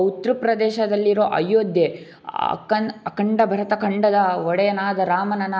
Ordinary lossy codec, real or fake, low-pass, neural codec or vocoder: none; real; none; none